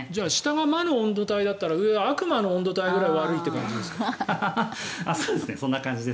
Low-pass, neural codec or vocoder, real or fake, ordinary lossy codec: none; none; real; none